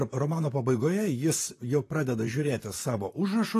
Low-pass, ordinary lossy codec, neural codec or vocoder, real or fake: 14.4 kHz; AAC, 48 kbps; vocoder, 44.1 kHz, 128 mel bands, Pupu-Vocoder; fake